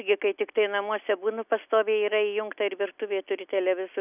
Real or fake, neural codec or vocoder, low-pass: real; none; 3.6 kHz